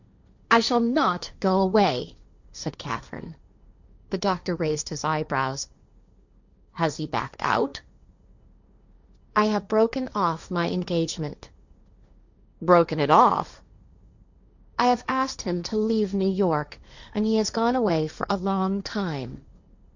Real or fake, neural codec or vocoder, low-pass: fake; codec, 16 kHz, 1.1 kbps, Voila-Tokenizer; 7.2 kHz